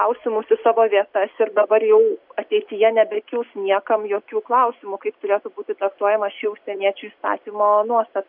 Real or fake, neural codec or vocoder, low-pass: real; none; 5.4 kHz